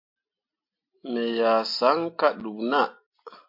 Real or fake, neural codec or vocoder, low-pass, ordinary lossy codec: real; none; 5.4 kHz; MP3, 32 kbps